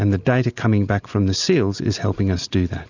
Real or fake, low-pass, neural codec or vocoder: real; 7.2 kHz; none